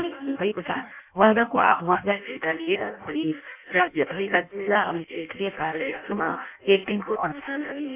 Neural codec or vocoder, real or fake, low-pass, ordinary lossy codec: codec, 16 kHz in and 24 kHz out, 0.6 kbps, FireRedTTS-2 codec; fake; 3.6 kHz; none